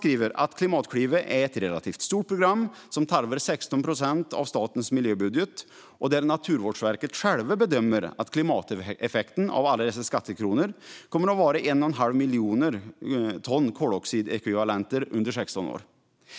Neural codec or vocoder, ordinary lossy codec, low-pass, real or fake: none; none; none; real